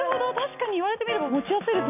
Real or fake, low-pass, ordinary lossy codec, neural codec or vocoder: real; 3.6 kHz; none; none